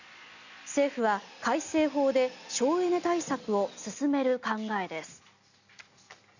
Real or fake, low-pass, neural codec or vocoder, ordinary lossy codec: real; 7.2 kHz; none; none